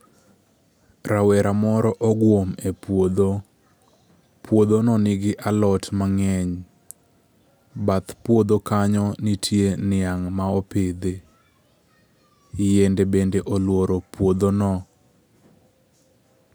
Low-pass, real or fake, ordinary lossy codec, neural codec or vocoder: none; real; none; none